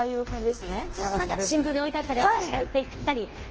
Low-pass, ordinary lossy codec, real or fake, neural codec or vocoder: 7.2 kHz; Opus, 16 kbps; fake; codec, 24 kHz, 1.2 kbps, DualCodec